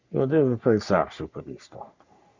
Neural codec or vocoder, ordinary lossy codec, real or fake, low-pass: codec, 44.1 kHz, 3.4 kbps, Pupu-Codec; Opus, 64 kbps; fake; 7.2 kHz